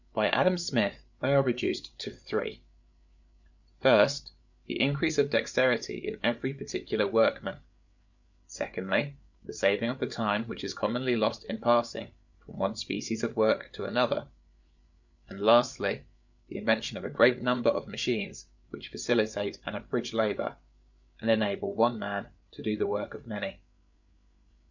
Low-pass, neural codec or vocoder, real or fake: 7.2 kHz; codec, 16 kHz, 8 kbps, FreqCodec, larger model; fake